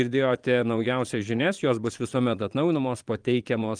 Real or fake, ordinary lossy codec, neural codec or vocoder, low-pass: fake; Opus, 32 kbps; vocoder, 22.05 kHz, 80 mel bands, Vocos; 9.9 kHz